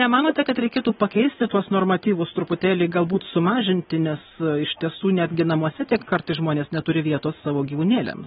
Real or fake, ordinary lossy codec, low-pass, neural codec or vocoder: real; AAC, 16 kbps; 10.8 kHz; none